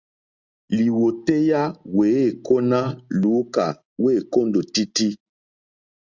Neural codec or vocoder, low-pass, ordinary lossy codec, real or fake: none; 7.2 kHz; Opus, 64 kbps; real